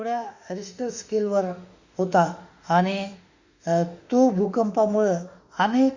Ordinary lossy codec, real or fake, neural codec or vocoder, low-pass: Opus, 64 kbps; fake; autoencoder, 48 kHz, 32 numbers a frame, DAC-VAE, trained on Japanese speech; 7.2 kHz